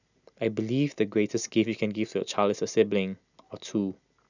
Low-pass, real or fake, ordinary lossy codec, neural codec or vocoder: 7.2 kHz; real; none; none